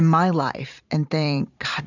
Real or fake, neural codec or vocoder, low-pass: fake; vocoder, 44.1 kHz, 128 mel bands every 512 samples, BigVGAN v2; 7.2 kHz